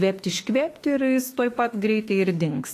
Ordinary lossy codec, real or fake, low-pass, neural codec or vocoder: AAC, 48 kbps; fake; 14.4 kHz; autoencoder, 48 kHz, 32 numbers a frame, DAC-VAE, trained on Japanese speech